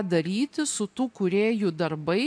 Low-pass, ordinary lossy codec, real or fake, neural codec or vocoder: 9.9 kHz; AAC, 64 kbps; real; none